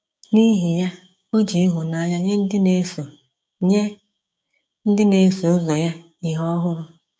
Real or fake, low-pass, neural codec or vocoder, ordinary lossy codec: fake; none; codec, 16 kHz, 6 kbps, DAC; none